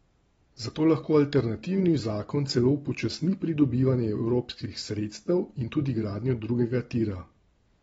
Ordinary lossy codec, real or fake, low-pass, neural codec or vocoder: AAC, 24 kbps; real; 14.4 kHz; none